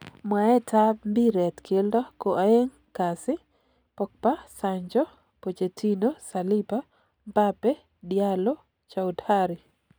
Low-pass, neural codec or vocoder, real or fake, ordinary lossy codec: none; none; real; none